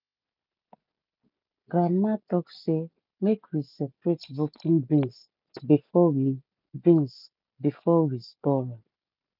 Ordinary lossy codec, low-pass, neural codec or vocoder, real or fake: none; 5.4 kHz; none; real